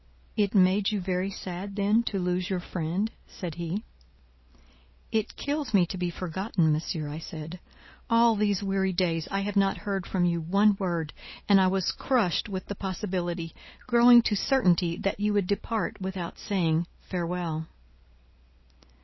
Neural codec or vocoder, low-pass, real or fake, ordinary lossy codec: none; 7.2 kHz; real; MP3, 24 kbps